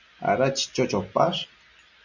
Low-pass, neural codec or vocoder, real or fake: 7.2 kHz; none; real